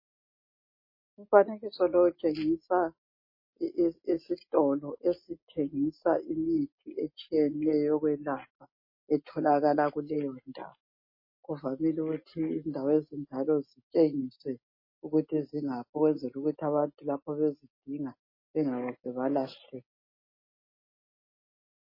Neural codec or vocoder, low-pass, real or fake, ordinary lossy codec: none; 5.4 kHz; real; MP3, 24 kbps